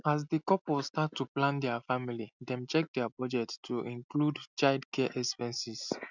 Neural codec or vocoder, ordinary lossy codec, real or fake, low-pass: none; none; real; 7.2 kHz